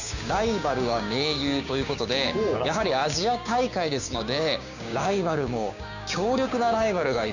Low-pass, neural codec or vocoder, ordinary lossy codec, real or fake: 7.2 kHz; codec, 16 kHz, 6 kbps, DAC; none; fake